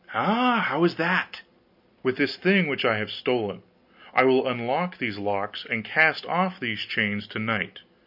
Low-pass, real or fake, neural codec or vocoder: 5.4 kHz; real; none